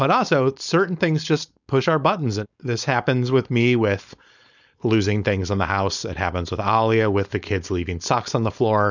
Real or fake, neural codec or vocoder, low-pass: fake; codec, 16 kHz, 4.8 kbps, FACodec; 7.2 kHz